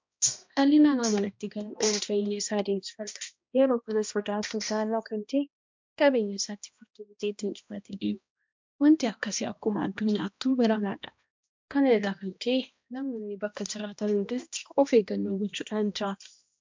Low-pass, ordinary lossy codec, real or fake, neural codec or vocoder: 7.2 kHz; MP3, 64 kbps; fake; codec, 16 kHz, 1 kbps, X-Codec, HuBERT features, trained on balanced general audio